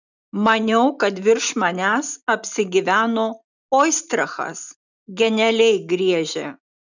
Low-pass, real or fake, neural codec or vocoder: 7.2 kHz; real; none